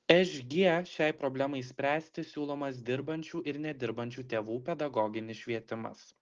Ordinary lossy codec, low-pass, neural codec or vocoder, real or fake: Opus, 16 kbps; 7.2 kHz; none; real